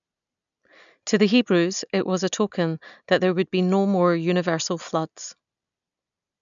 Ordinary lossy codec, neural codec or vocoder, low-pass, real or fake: none; none; 7.2 kHz; real